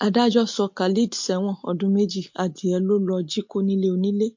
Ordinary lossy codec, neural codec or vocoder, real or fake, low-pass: MP3, 48 kbps; none; real; 7.2 kHz